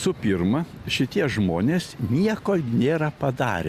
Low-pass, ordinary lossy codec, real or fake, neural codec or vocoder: 14.4 kHz; Opus, 64 kbps; real; none